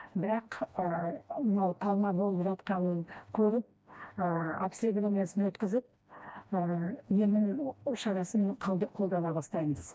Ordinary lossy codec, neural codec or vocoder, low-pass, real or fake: none; codec, 16 kHz, 1 kbps, FreqCodec, smaller model; none; fake